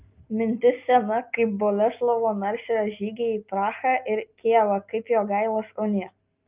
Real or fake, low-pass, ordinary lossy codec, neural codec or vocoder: real; 3.6 kHz; Opus, 24 kbps; none